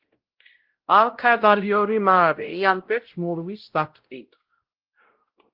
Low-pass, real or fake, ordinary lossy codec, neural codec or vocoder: 5.4 kHz; fake; Opus, 16 kbps; codec, 16 kHz, 0.5 kbps, X-Codec, WavLM features, trained on Multilingual LibriSpeech